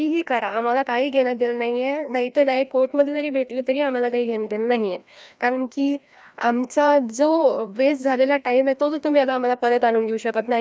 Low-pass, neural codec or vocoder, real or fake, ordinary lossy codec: none; codec, 16 kHz, 1 kbps, FreqCodec, larger model; fake; none